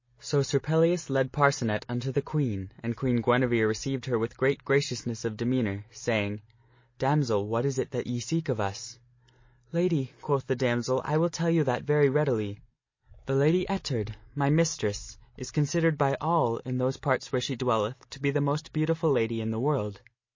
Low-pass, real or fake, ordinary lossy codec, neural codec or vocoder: 7.2 kHz; real; MP3, 32 kbps; none